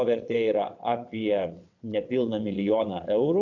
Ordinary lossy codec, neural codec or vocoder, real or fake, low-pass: AAC, 48 kbps; vocoder, 22.05 kHz, 80 mel bands, WaveNeXt; fake; 7.2 kHz